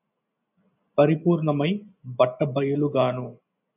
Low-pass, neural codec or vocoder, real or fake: 3.6 kHz; none; real